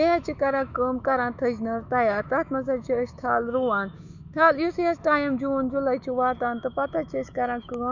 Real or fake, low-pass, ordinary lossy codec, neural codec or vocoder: fake; 7.2 kHz; none; autoencoder, 48 kHz, 128 numbers a frame, DAC-VAE, trained on Japanese speech